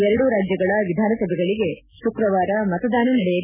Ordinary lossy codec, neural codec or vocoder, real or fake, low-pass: none; none; real; 3.6 kHz